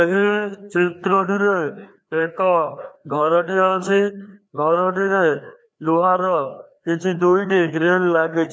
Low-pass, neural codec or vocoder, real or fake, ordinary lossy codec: none; codec, 16 kHz, 2 kbps, FreqCodec, larger model; fake; none